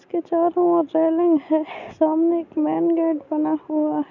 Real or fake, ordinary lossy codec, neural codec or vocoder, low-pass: real; none; none; 7.2 kHz